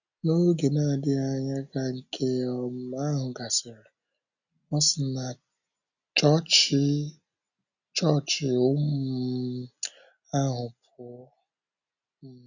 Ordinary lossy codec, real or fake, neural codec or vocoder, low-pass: none; real; none; 7.2 kHz